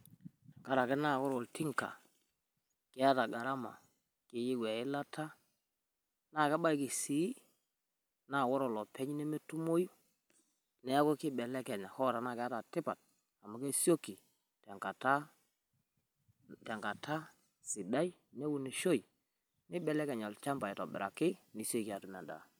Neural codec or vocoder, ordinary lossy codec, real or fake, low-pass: none; none; real; none